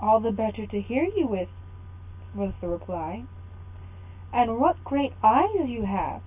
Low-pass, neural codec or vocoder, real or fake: 3.6 kHz; none; real